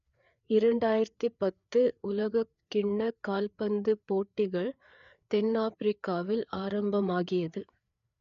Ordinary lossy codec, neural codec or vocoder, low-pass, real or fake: none; codec, 16 kHz, 4 kbps, FreqCodec, larger model; 7.2 kHz; fake